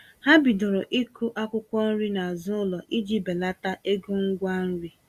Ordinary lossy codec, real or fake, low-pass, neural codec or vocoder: Opus, 64 kbps; real; 14.4 kHz; none